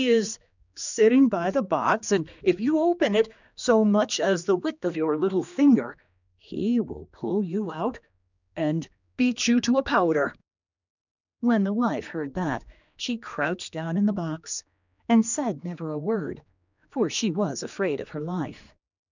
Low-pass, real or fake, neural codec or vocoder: 7.2 kHz; fake; codec, 16 kHz, 2 kbps, X-Codec, HuBERT features, trained on general audio